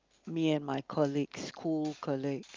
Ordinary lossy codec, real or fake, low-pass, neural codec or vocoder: Opus, 24 kbps; real; 7.2 kHz; none